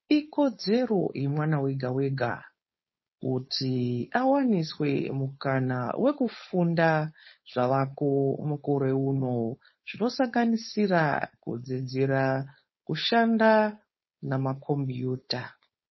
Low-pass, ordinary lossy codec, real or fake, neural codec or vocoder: 7.2 kHz; MP3, 24 kbps; fake; codec, 16 kHz, 4.8 kbps, FACodec